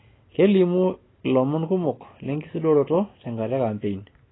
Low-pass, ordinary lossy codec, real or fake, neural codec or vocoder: 7.2 kHz; AAC, 16 kbps; real; none